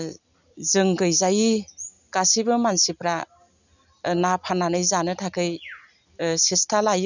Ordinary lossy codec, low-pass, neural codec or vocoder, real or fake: none; 7.2 kHz; none; real